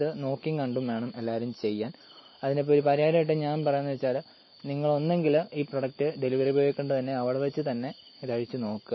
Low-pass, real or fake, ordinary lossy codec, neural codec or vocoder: 7.2 kHz; real; MP3, 24 kbps; none